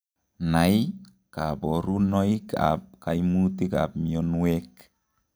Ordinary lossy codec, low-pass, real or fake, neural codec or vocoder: none; none; real; none